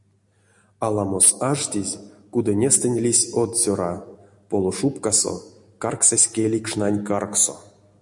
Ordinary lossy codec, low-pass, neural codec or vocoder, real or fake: MP3, 64 kbps; 10.8 kHz; none; real